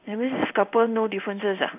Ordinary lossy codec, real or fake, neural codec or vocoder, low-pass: none; fake; codec, 16 kHz in and 24 kHz out, 1 kbps, XY-Tokenizer; 3.6 kHz